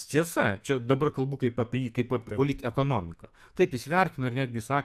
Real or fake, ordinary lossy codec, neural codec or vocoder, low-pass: fake; AAC, 96 kbps; codec, 44.1 kHz, 2.6 kbps, SNAC; 14.4 kHz